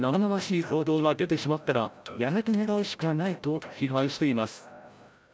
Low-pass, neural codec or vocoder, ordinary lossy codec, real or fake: none; codec, 16 kHz, 0.5 kbps, FreqCodec, larger model; none; fake